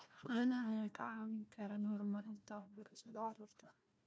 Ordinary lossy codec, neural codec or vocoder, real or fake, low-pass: none; codec, 16 kHz, 1 kbps, FunCodec, trained on Chinese and English, 50 frames a second; fake; none